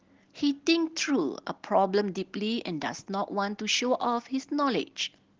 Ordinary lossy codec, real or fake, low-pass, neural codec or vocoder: Opus, 16 kbps; real; 7.2 kHz; none